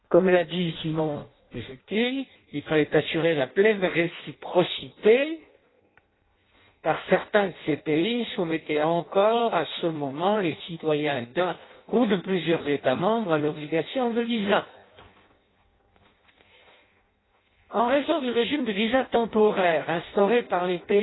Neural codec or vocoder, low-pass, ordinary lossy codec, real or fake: codec, 16 kHz in and 24 kHz out, 0.6 kbps, FireRedTTS-2 codec; 7.2 kHz; AAC, 16 kbps; fake